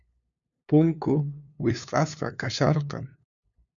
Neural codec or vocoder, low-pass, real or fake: codec, 16 kHz, 2 kbps, FunCodec, trained on LibriTTS, 25 frames a second; 7.2 kHz; fake